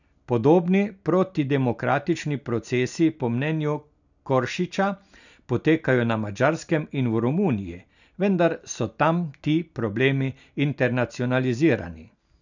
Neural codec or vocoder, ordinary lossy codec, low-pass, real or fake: none; none; 7.2 kHz; real